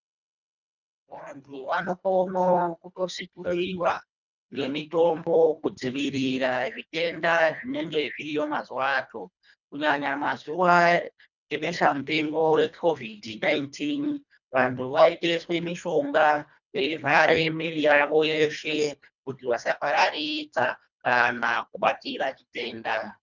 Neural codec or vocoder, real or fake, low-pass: codec, 24 kHz, 1.5 kbps, HILCodec; fake; 7.2 kHz